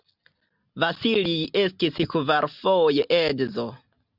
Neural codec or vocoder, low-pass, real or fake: none; 5.4 kHz; real